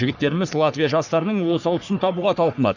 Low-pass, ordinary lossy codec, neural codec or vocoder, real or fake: 7.2 kHz; none; codec, 44.1 kHz, 3.4 kbps, Pupu-Codec; fake